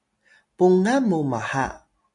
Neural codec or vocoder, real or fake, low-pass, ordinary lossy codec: none; real; 10.8 kHz; AAC, 64 kbps